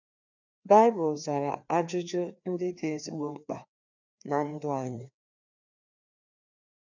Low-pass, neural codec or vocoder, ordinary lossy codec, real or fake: 7.2 kHz; codec, 16 kHz, 2 kbps, FreqCodec, larger model; none; fake